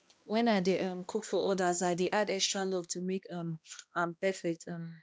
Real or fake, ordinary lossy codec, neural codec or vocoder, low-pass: fake; none; codec, 16 kHz, 1 kbps, X-Codec, HuBERT features, trained on balanced general audio; none